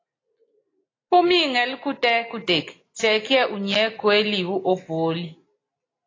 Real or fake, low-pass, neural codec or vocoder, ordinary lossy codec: real; 7.2 kHz; none; AAC, 32 kbps